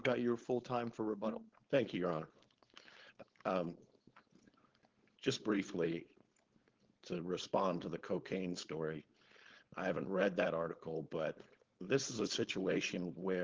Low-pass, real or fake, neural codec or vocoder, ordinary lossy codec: 7.2 kHz; fake; codec, 16 kHz, 4.8 kbps, FACodec; Opus, 16 kbps